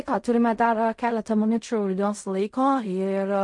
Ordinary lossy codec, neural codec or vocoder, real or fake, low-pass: MP3, 48 kbps; codec, 16 kHz in and 24 kHz out, 0.4 kbps, LongCat-Audio-Codec, fine tuned four codebook decoder; fake; 10.8 kHz